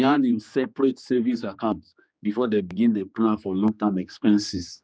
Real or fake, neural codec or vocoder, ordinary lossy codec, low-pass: fake; codec, 16 kHz, 2 kbps, X-Codec, HuBERT features, trained on general audio; none; none